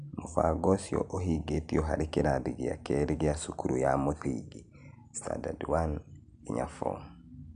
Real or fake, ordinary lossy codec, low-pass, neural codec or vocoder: real; AAC, 96 kbps; 9.9 kHz; none